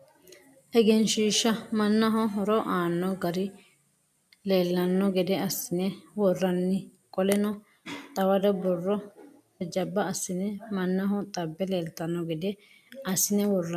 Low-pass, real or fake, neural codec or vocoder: 14.4 kHz; real; none